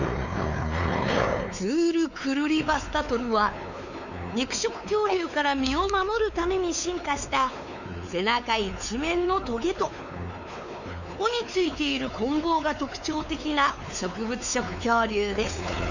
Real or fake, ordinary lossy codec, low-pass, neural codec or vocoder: fake; none; 7.2 kHz; codec, 16 kHz, 4 kbps, X-Codec, WavLM features, trained on Multilingual LibriSpeech